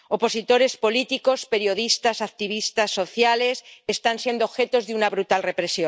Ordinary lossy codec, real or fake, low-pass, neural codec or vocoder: none; real; none; none